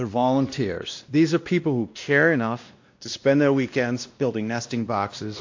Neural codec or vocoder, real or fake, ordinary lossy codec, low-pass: codec, 16 kHz, 1 kbps, X-Codec, WavLM features, trained on Multilingual LibriSpeech; fake; AAC, 48 kbps; 7.2 kHz